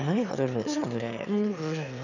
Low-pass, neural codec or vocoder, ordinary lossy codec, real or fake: 7.2 kHz; autoencoder, 22.05 kHz, a latent of 192 numbers a frame, VITS, trained on one speaker; none; fake